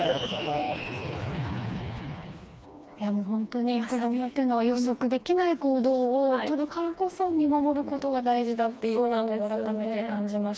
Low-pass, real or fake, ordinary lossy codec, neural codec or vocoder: none; fake; none; codec, 16 kHz, 2 kbps, FreqCodec, smaller model